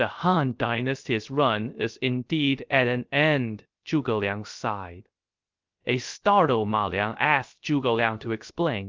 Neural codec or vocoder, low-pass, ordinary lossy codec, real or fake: codec, 16 kHz, 0.3 kbps, FocalCodec; 7.2 kHz; Opus, 32 kbps; fake